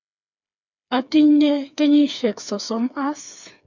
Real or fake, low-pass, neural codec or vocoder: fake; 7.2 kHz; codec, 16 kHz, 4 kbps, FreqCodec, smaller model